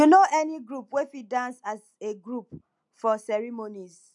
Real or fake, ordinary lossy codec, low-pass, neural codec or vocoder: real; MP3, 64 kbps; 10.8 kHz; none